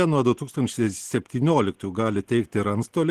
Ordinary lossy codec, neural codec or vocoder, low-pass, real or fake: Opus, 16 kbps; none; 14.4 kHz; real